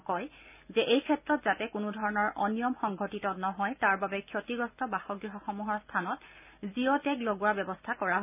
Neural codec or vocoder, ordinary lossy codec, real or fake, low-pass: none; none; real; 3.6 kHz